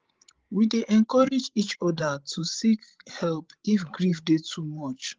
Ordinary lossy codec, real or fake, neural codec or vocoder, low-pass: Opus, 16 kbps; fake; codec, 16 kHz, 16 kbps, FreqCodec, larger model; 7.2 kHz